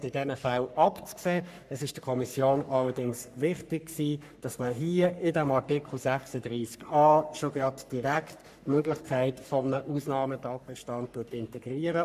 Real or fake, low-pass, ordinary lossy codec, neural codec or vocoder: fake; 14.4 kHz; none; codec, 44.1 kHz, 3.4 kbps, Pupu-Codec